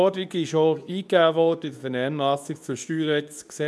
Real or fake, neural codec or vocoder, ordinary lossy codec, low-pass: fake; codec, 24 kHz, 0.9 kbps, WavTokenizer, medium speech release version 2; none; none